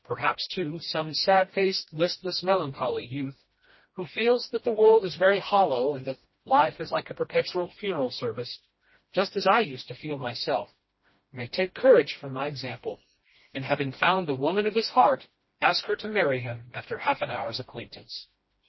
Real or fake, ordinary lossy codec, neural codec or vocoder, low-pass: fake; MP3, 24 kbps; codec, 16 kHz, 1 kbps, FreqCodec, smaller model; 7.2 kHz